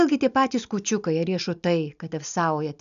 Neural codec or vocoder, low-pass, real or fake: none; 7.2 kHz; real